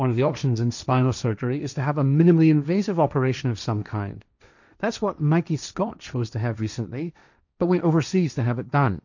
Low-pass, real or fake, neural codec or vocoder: 7.2 kHz; fake; codec, 16 kHz, 1.1 kbps, Voila-Tokenizer